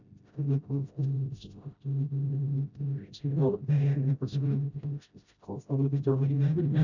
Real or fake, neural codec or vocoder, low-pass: fake; codec, 16 kHz, 0.5 kbps, FreqCodec, smaller model; 7.2 kHz